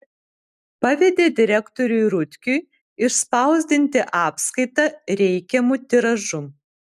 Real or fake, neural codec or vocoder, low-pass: real; none; 14.4 kHz